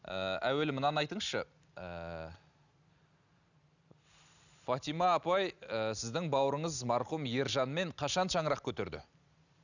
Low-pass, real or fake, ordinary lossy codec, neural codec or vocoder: 7.2 kHz; real; none; none